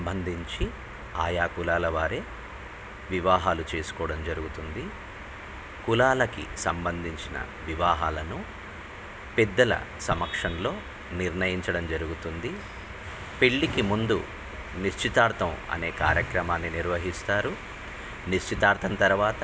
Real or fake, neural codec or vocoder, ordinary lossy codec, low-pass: real; none; none; none